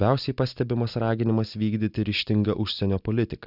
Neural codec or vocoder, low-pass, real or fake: none; 5.4 kHz; real